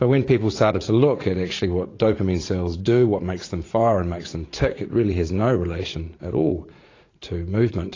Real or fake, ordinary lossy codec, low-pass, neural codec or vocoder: fake; AAC, 32 kbps; 7.2 kHz; vocoder, 44.1 kHz, 80 mel bands, Vocos